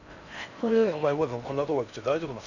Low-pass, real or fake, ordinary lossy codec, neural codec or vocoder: 7.2 kHz; fake; none; codec, 16 kHz in and 24 kHz out, 0.6 kbps, FocalCodec, streaming, 4096 codes